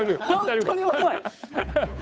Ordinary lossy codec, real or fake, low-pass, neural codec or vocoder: none; fake; none; codec, 16 kHz, 8 kbps, FunCodec, trained on Chinese and English, 25 frames a second